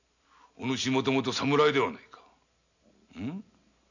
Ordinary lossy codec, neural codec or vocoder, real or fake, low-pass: none; none; real; 7.2 kHz